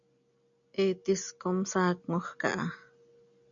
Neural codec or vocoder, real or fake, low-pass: none; real; 7.2 kHz